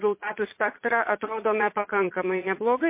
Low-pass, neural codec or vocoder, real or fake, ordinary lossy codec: 3.6 kHz; vocoder, 22.05 kHz, 80 mel bands, Vocos; fake; MP3, 24 kbps